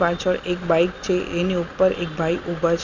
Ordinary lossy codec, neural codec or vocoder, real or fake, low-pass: none; none; real; 7.2 kHz